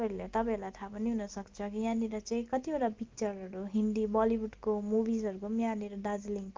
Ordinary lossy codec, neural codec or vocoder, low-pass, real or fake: Opus, 32 kbps; none; 7.2 kHz; real